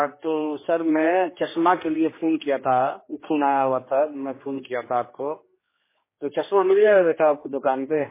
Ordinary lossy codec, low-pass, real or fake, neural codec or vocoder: MP3, 16 kbps; 3.6 kHz; fake; codec, 16 kHz, 2 kbps, X-Codec, HuBERT features, trained on general audio